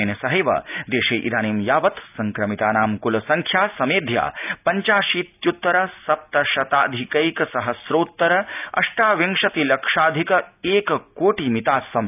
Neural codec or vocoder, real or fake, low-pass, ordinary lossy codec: none; real; 3.6 kHz; none